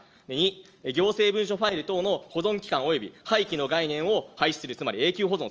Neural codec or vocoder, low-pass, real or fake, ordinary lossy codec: none; 7.2 kHz; real; Opus, 24 kbps